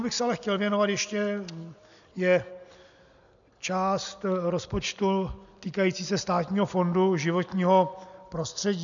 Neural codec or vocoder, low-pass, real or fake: none; 7.2 kHz; real